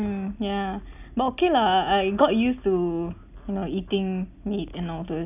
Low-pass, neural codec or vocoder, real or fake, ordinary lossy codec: 3.6 kHz; none; real; none